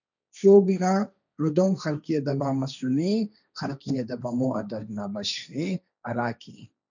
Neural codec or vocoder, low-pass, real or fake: codec, 16 kHz, 1.1 kbps, Voila-Tokenizer; 7.2 kHz; fake